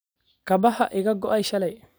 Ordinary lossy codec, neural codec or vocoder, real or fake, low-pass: none; none; real; none